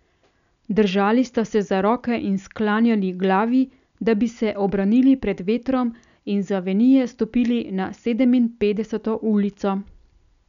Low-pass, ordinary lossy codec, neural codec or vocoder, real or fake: 7.2 kHz; none; none; real